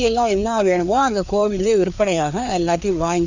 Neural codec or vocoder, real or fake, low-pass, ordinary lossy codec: codec, 16 kHz, 2 kbps, FreqCodec, larger model; fake; 7.2 kHz; none